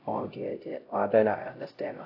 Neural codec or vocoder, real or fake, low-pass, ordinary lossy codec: codec, 16 kHz, 0.5 kbps, X-Codec, HuBERT features, trained on LibriSpeech; fake; 5.4 kHz; AAC, 32 kbps